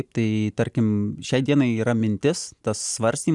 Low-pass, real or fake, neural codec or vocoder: 10.8 kHz; real; none